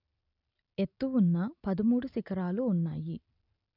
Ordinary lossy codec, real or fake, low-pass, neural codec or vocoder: none; real; 5.4 kHz; none